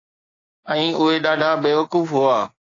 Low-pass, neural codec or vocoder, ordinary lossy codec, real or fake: 7.2 kHz; codec, 16 kHz, 6 kbps, DAC; AAC, 32 kbps; fake